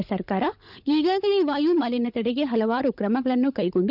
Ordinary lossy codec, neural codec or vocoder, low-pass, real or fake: none; codec, 16 kHz, 16 kbps, FunCodec, trained on LibriTTS, 50 frames a second; 5.4 kHz; fake